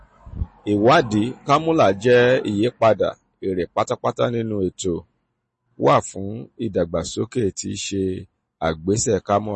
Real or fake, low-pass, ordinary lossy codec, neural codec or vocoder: real; 10.8 kHz; MP3, 32 kbps; none